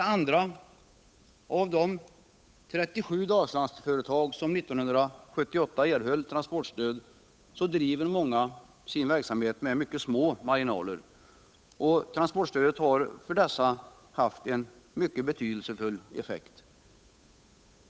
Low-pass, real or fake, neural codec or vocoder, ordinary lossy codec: none; fake; codec, 16 kHz, 8 kbps, FunCodec, trained on Chinese and English, 25 frames a second; none